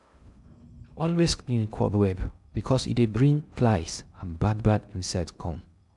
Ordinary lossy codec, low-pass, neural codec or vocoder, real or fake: none; 10.8 kHz; codec, 16 kHz in and 24 kHz out, 0.6 kbps, FocalCodec, streaming, 2048 codes; fake